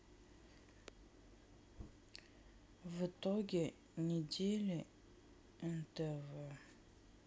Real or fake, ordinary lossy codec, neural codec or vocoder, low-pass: real; none; none; none